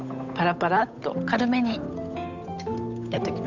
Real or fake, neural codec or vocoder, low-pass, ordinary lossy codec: fake; codec, 16 kHz, 8 kbps, FunCodec, trained on Chinese and English, 25 frames a second; 7.2 kHz; none